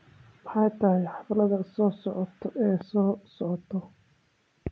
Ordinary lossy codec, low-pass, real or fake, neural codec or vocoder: none; none; real; none